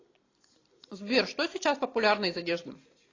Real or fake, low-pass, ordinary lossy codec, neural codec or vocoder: real; 7.2 kHz; AAC, 32 kbps; none